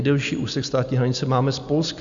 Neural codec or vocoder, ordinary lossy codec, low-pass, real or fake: none; AAC, 64 kbps; 7.2 kHz; real